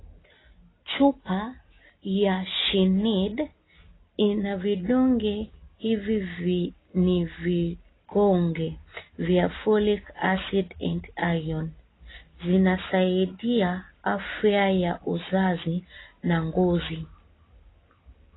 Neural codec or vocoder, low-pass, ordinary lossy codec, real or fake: none; 7.2 kHz; AAC, 16 kbps; real